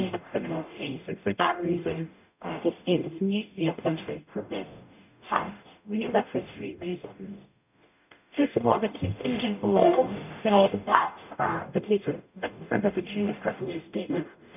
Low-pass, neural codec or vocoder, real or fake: 3.6 kHz; codec, 44.1 kHz, 0.9 kbps, DAC; fake